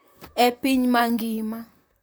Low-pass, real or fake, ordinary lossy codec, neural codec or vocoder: none; fake; none; vocoder, 44.1 kHz, 128 mel bands, Pupu-Vocoder